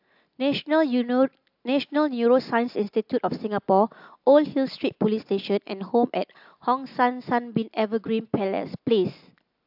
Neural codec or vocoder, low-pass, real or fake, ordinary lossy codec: none; 5.4 kHz; real; AAC, 48 kbps